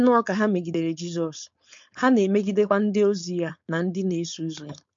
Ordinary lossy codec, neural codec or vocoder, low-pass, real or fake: MP3, 48 kbps; codec, 16 kHz, 4.8 kbps, FACodec; 7.2 kHz; fake